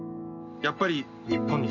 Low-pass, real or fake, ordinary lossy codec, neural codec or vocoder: 7.2 kHz; real; none; none